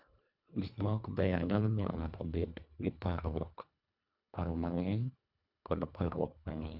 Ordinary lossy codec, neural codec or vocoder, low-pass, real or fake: none; codec, 24 kHz, 1.5 kbps, HILCodec; 5.4 kHz; fake